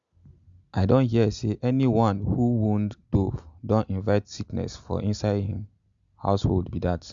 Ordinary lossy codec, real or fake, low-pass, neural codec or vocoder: Opus, 64 kbps; real; 7.2 kHz; none